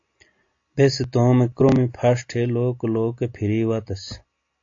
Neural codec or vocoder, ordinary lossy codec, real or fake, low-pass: none; AAC, 48 kbps; real; 7.2 kHz